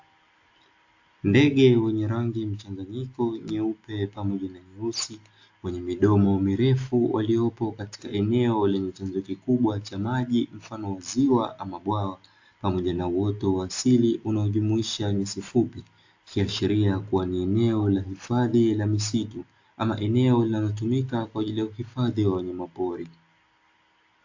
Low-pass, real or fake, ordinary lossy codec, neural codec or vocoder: 7.2 kHz; real; AAC, 48 kbps; none